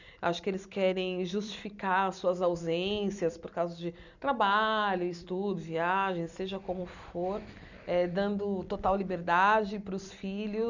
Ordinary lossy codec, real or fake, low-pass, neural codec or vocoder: none; fake; 7.2 kHz; vocoder, 44.1 kHz, 128 mel bands every 256 samples, BigVGAN v2